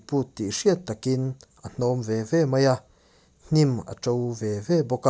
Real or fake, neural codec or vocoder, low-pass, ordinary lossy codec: real; none; none; none